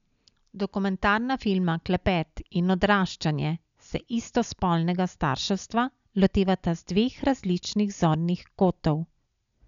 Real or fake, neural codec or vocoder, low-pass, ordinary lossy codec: real; none; 7.2 kHz; none